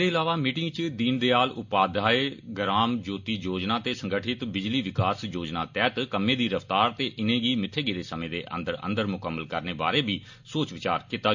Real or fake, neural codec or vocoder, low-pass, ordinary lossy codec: real; none; 7.2 kHz; MP3, 48 kbps